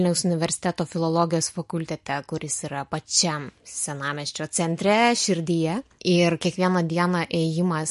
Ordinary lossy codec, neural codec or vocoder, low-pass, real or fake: MP3, 48 kbps; none; 14.4 kHz; real